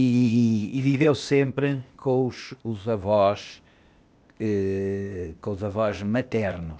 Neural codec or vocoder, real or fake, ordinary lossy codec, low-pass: codec, 16 kHz, 0.8 kbps, ZipCodec; fake; none; none